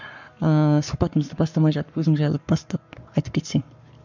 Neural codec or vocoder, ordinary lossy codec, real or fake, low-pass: codec, 44.1 kHz, 7.8 kbps, Pupu-Codec; none; fake; 7.2 kHz